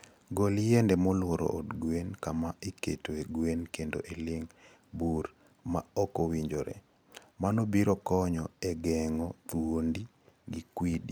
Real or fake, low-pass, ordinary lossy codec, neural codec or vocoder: real; none; none; none